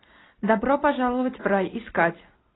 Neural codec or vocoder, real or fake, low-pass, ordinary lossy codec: none; real; 7.2 kHz; AAC, 16 kbps